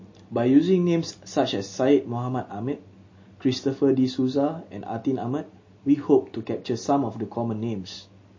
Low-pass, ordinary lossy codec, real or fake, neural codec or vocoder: 7.2 kHz; MP3, 32 kbps; real; none